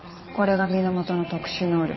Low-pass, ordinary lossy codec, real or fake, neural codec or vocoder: 7.2 kHz; MP3, 24 kbps; fake; vocoder, 22.05 kHz, 80 mel bands, Vocos